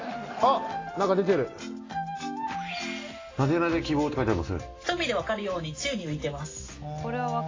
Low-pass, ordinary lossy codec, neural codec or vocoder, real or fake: 7.2 kHz; AAC, 32 kbps; none; real